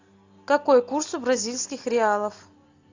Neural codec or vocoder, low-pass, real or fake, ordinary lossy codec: none; 7.2 kHz; real; AAC, 48 kbps